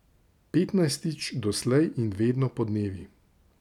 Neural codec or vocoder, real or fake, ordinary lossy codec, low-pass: none; real; none; 19.8 kHz